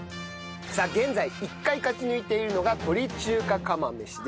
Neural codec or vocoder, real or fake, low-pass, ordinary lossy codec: none; real; none; none